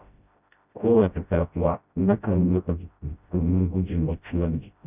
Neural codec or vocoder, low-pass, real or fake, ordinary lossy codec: codec, 16 kHz, 0.5 kbps, FreqCodec, smaller model; 3.6 kHz; fake; none